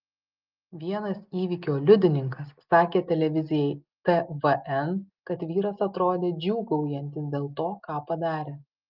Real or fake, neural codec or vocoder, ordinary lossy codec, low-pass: real; none; Opus, 32 kbps; 5.4 kHz